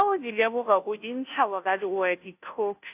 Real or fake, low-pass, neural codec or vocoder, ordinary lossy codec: fake; 3.6 kHz; codec, 16 kHz, 0.5 kbps, FunCodec, trained on Chinese and English, 25 frames a second; AAC, 32 kbps